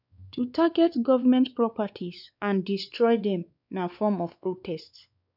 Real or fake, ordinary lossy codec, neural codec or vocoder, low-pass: fake; none; codec, 16 kHz, 4 kbps, X-Codec, WavLM features, trained on Multilingual LibriSpeech; 5.4 kHz